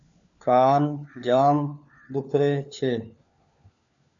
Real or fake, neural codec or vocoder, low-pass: fake; codec, 16 kHz, 4 kbps, FunCodec, trained on LibriTTS, 50 frames a second; 7.2 kHz